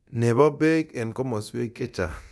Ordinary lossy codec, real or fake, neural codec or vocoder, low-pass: none; fake; codec, 24 kHz, 0.9 kbps, DualCodec; none